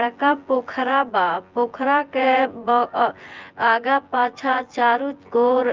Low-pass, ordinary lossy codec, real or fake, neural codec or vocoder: 7.2 kHz; Opus, 32 kbps; fake; vocoder, 24 kHz, 100 mel bands, Vocos